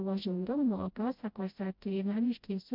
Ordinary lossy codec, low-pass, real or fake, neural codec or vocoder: MP3, 48 kbps; 5.4 kHz; fake; codec, 16 kHz, 0.5 kbps, FreqCodec, smaller model